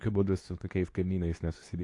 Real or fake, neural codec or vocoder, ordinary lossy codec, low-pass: fake; codec, 24 kHz, 0.9 kbps, WavTokenizer, medium speech release version 2; AAC, 48 kbps; 10.8 kHz